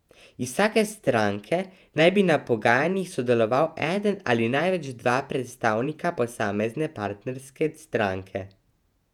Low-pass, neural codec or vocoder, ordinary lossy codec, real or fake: 19.8 kHz; vocoder, 48 kHz, 128 mel bands, Vocos; none; fake